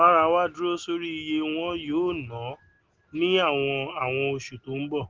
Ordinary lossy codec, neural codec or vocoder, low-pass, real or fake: Opus, 16 kbps; none; 7.2 kHz; real